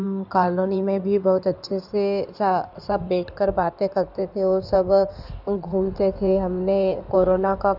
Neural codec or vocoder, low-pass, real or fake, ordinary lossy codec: codec, 16 kHz in and 24 kHz out, 2.2 kbps, FireRedTTS-2 codec; 5.4 kHz; fake; none